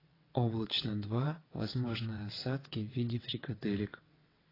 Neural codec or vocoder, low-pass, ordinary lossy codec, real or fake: vocoder, 22.05 kHz, 80 mel bands, WaveNeXt; 5.4 kHz; AAC, 24 kbps; fake